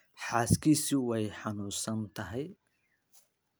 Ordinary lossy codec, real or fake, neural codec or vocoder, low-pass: none; real; none; none